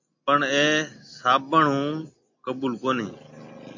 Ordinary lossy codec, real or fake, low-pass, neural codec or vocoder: AAC, 48 kbps; real; 7.2 kHz; none